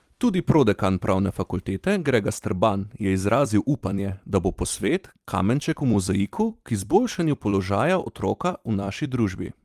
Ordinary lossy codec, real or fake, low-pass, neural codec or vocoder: Opus, 32 kbps; fake; 14.4 kHz; vocoder, 44.1 kHz, 128 mel bands every 256 samples, BigVGAN v2